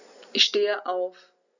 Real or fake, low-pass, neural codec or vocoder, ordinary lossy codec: real; 7.2 kHz; none; none